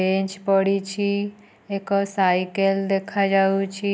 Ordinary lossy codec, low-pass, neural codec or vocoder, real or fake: none; none; none; real